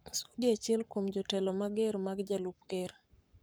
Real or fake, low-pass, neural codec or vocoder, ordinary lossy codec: fake; none; codec, 44.1 kHz, 7.8 kbps, Pupu-Codec; none